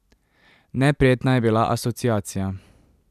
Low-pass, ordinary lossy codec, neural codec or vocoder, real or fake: 14.4 kHz; none; vocoder, 44.1 kHz, 128 mel bands every 256 samples, BigVGAN v2; fake